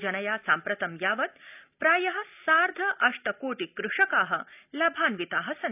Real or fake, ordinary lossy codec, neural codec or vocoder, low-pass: real; none; none; 3.6 kHz